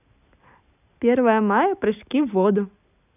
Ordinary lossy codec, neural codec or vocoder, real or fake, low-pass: none; none; real; 3.6 kHz